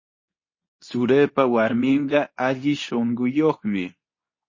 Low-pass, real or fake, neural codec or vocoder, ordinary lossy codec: 7.2 kHz; fake; codec, 24 kHz, 0.9 kbps, WavTokenizer, medium speech release version 2; MP3, 32 kbps